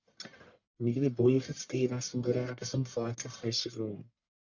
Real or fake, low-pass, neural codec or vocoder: fake; 7.2 kHz; codec, 44.1 kHz, 1.7 kbps, Pupu-Codec